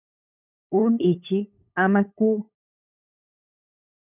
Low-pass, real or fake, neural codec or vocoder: 3.6 kHz; fake; codec, 16 kHz, 8 kbps, FunCodec, trained on LibriTTS, 25 frames a second